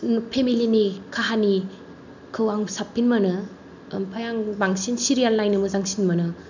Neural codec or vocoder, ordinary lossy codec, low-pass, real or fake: none; none; 7.2 kHz; real